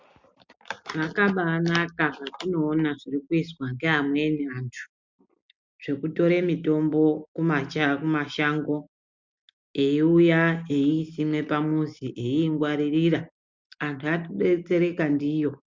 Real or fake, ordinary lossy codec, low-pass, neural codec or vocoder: real; MP3, 64 kbps; 7.2 kHz; none